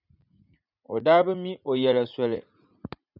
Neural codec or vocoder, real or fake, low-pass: vocoder, 44.1 kHz, 80 mel bands, Vocos; fake; 5.4 kHz